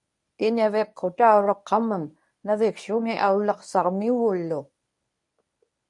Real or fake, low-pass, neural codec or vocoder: fake; 10.8 kHz; codec, 24 kHz, 0.9 kbps, WavTokenizer, medium speech release version 1